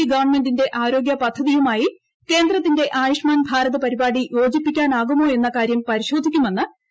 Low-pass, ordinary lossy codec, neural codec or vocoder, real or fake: none; none; none; real